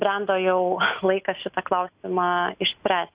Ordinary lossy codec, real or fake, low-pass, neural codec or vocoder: Opus, 64 kbps; real; 3.6 kHz; none